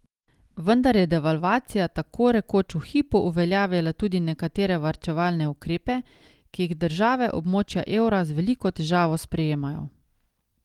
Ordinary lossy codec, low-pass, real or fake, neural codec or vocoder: Opus, 24 kbps; 19.8 kHz; real; none